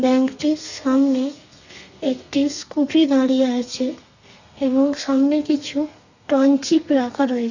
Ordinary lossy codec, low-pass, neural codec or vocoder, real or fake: none; 7.2 kHz; codec, 32 kHz, 1.9 kbps, SNAC; fake